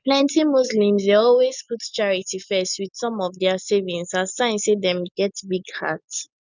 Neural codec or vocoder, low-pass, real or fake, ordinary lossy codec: none; 7.2 kHz; real; none